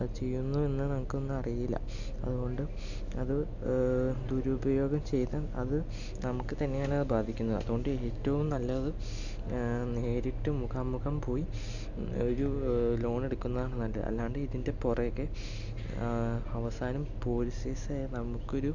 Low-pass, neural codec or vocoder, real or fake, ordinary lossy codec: 7.2 kHz; none; real; none